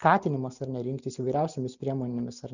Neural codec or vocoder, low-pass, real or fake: none; 7.2 kHz; real